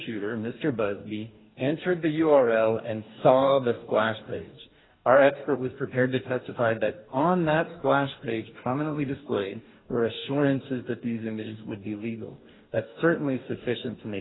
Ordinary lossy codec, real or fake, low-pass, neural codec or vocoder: AAC, 16 kbps; fake; 7.2 kHz; codec, 44.1 kHz, 2.6 kbps, DAC